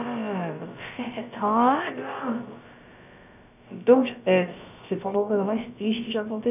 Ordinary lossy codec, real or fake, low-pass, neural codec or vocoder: none; fake; 3.6 kHz; codec, 16 kHz, about 1 kbps, DyCAST, with the encoder's durations